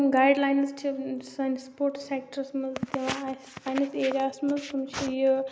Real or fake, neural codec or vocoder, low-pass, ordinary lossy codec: real; none; none; none